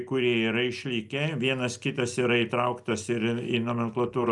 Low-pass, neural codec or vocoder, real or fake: 10.8 kHz; none; real